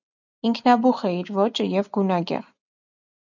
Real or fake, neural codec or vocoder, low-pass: real; none; 7.2 kHz